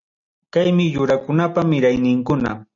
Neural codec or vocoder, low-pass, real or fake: none; 7.2 kHz; real